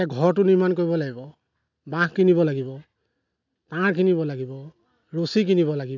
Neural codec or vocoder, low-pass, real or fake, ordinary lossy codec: none; 7.2 kHz; real; none